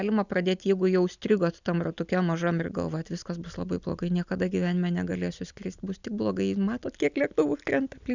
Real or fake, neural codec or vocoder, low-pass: real; none; 7.2 kHz